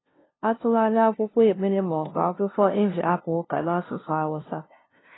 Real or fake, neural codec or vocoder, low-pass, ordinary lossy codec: fake; codec, 16 kHz, 0.5 kbps, FunCodec, trained on LibriTTS, 25 frames a second; 7.2 kHz; AAC, 16 kbps